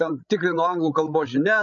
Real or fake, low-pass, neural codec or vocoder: fake; 7.2 kHz; codec, 16 kHz, 16 kbps, FreqCodec, larger model